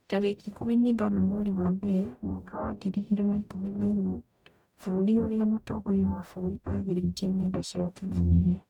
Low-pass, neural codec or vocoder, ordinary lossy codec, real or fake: 19.8 kHz; codec, 44.1 kHz, 0.9 kbps, DAC; none; fake